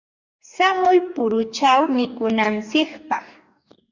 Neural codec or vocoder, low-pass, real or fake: codec, 44.1 kHz, 2.6 kbps, DAC; 7.2 kHz; fake